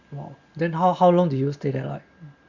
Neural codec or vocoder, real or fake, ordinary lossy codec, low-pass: none; real; Opus, 64 kbps; 7.2 kHz